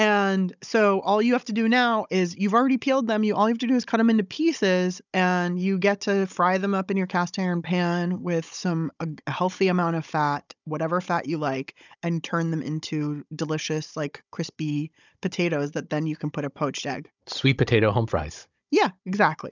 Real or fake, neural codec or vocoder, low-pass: fake; codec, 16 kHz, 16 kbps, FunCodec, trained on Chinese and English, 50 frames a second; 7.2 kHz